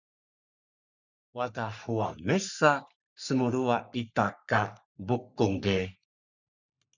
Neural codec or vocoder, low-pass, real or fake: codec, 44.1 kHz, 3.4 kbps, Pupu-Codec; 7.2 kHz; fake